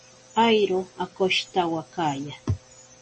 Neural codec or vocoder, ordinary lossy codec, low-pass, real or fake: none; MP3, 32 kbps; 9.9 kHz; real